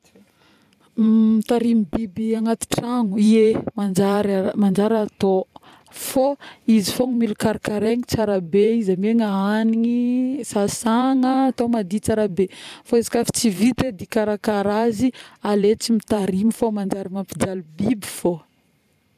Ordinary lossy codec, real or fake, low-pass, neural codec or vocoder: none; fake; 14.4 kHz; vocoder, 44.1 kHz, 128 mel bands every 512 samples, BigVGAN v2